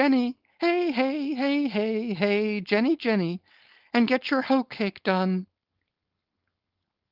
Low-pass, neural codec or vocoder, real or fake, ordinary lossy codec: 5.4 kHz; codec, 16 kHz, 4.8 kbps, FACodec; fake; Opus, 24 kbps